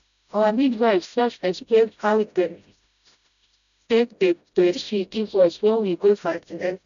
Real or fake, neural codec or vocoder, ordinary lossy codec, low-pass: fake; codec, 16 kHz, 0.5 kbps, FreqCodec, smaller model; none; 7.2 kHz